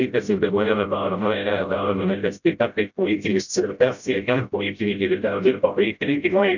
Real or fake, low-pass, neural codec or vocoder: fake; 7.2 kHz; codec, 16 kHz, 0.5 kbps, FreqCodec, smaller model